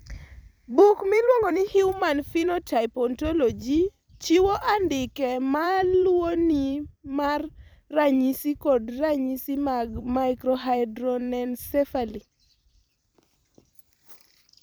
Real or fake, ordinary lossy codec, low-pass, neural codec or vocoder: fake; none; none; vocoder, 44.1 kHz, 128 mel bands every 256 samples, BigVGAN v2